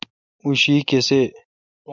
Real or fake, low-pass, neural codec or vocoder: real; 7.2 kHz; none